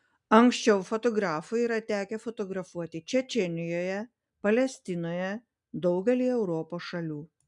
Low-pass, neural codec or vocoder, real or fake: 10.8 kHz; none; real